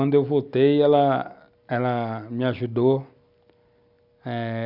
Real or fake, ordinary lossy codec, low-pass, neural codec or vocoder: real; Opus, 64 kbps; 5.4 kHz; none